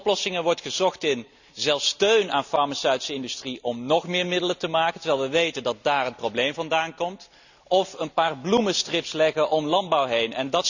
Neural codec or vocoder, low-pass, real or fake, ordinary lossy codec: none; 7.2 kHz; real; none